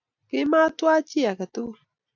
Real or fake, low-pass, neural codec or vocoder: real; 7.2 kHz; none